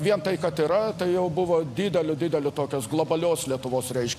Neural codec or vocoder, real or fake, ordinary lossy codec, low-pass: none; real; AAC, 64 kbps; 14.4 kHz